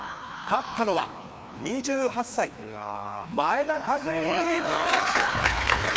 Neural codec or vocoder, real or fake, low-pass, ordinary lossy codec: codec, 16 kHz, 2 kbps, FreqCodec, larger model; fake; none; none